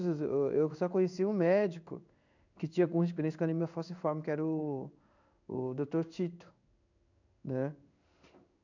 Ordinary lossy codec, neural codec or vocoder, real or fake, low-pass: none; codec, 16 kHz in and 24 kHz out, 1 kbps, XY-Tokenizer; fake; 7.2 kHz